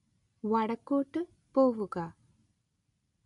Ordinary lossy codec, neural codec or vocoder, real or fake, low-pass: none; none; real; 10.8 kHz